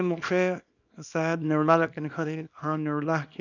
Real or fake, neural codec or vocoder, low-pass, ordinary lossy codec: fake; codec, 24 kHz, 0.9 kbps, WavTokenizer, small release; 7.2 kHz; none